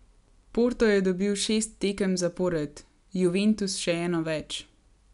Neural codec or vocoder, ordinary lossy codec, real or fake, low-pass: none; none; real; 10.8 kHz